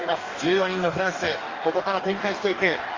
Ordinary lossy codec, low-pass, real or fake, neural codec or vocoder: Opus, 32 kbps; 7.2 kHz; fake; codec, 44.1 kHz, 2.6 kbps, DAC